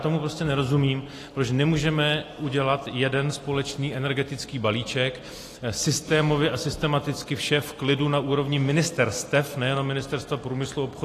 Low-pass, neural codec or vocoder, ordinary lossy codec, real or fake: 14.4 kHz; none; AAC, 48 kbps; real